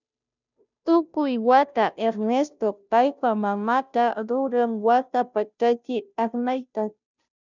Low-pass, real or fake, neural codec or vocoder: 7.2 kHz; fake; codec, 16 kHz, 0.5 kbps, FunCodec, trained on Chinese and English, 25 frames a second